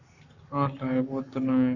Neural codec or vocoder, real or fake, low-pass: codec, 44.1 kHz, 7.8 kbps, Pupu-Codec; fake; 7.2 kHz